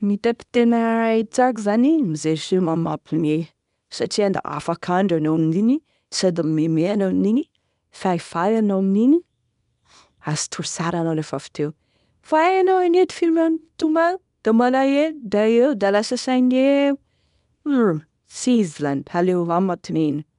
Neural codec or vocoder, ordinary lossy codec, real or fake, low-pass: codec, 24 kHz, 0.9 kbps, WavTokenizer, small release; none; fake; 10.8 kHz